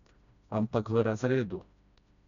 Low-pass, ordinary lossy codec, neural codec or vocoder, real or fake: 7.2 kHz; none; codec, 16 kHz, 1 kbps, FreqCodec, smaller model; fake